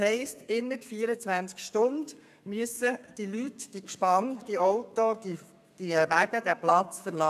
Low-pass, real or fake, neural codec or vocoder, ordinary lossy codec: 14.4 kHz; fake; codec, 32 kHz, 1.9 kbps, SNAC; AAC, 96 kbps